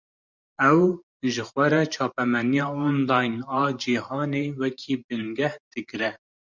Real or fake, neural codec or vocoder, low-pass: fake; vocoder, 44.1 kHz, 128 mel bands every 512 samples, BigVGAN v2; 7.2 kHz